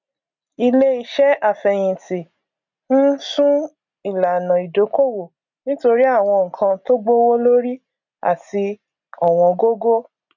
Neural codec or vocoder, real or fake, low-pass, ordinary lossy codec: none; real; 7.2 kHz; none